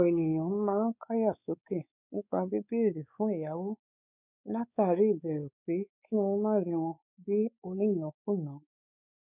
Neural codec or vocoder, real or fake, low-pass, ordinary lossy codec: codec, 16 kHz, 4 kbps, X-Codec, WavLM features, trained on Multilingual LibriSpeech; fake; 3.6 kHz; none